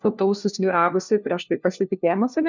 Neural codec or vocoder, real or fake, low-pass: codec, 16 kHz, 1 kbps, FunCodec, trained on LibriTTS, 50 frames a second; fake; 7.2 kHz